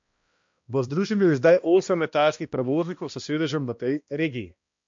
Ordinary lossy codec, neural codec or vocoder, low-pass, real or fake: MP3, 48 kbps; codec, 16 kHz, 1 kbps, X-Codec, HuBERT features, trained on balanced general audio; 7.2 kHz; fake